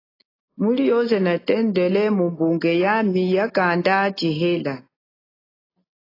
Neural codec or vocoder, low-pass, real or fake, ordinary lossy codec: none; 5.4 kHz; real; AAC, 24 kbps